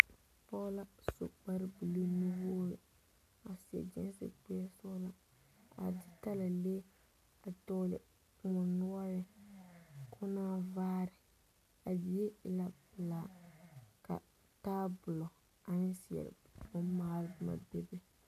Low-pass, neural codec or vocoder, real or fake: 14.4 kHz; none; real